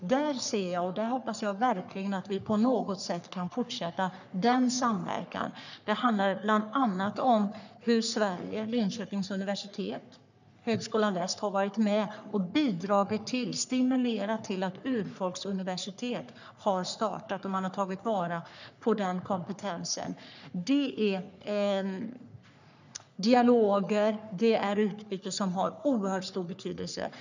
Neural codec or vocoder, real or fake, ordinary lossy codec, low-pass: codec, 44.1 kHz, 3.4 kbps, Pupu-Codec; fake; none; 7.2 kHz